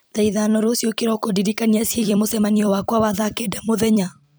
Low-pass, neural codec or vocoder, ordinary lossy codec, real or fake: none; vocoder, 44.1 kHz, 128 mel bands every 256 samples, BigVGAN v2; none; fake